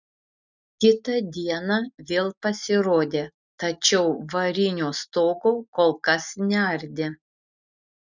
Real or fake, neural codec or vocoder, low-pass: real; none; 7.2 kHz